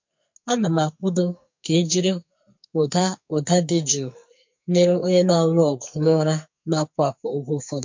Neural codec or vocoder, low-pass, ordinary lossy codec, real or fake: codec, 44.1 kHz, 2.6 kbps, SNAC; 7.2 kHz; MP3, 48 kbps; fake